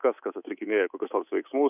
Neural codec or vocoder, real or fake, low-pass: codec, 24 kHz, 3.1 kbps, DualCodec; fake; 3.6 kHz